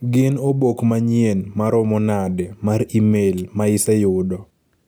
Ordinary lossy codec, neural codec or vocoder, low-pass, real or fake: none; none; none; real